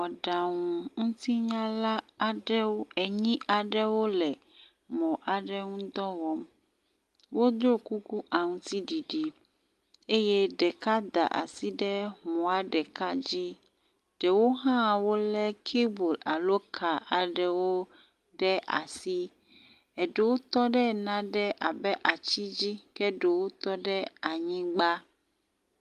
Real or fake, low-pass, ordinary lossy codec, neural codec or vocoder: real; 14.4 kHz; Opus, 32 kbps; none